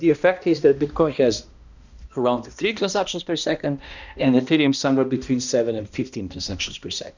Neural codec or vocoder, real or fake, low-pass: codec, 16 kHz, 1 kbps, X-Codec, HuBERT features, trained on balanced general audio; fake; 7.2 kHz